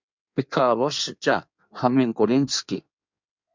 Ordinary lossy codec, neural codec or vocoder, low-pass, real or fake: MP3, 64 kbps; codec, 16 kHz in and 24 kHz out, 1.1 kbps, FireRedTTS-2 codec; 7.2 kHz; fake